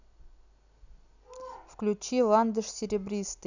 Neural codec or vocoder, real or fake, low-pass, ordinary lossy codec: none; real; 7.2 kHz; none